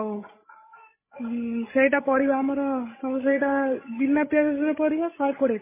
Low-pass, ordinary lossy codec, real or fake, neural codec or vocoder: 3.6 kHz; MP3, 16 kbps; fake; codec, 16 kHz, 16 kbps, FreqCodec, larger model